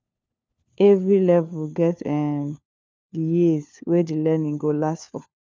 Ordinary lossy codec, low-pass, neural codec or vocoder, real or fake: none; none; codec, 16 kHz, 4 kbps, FunCodec, trained on LibriTTS, 50 frames a second; fake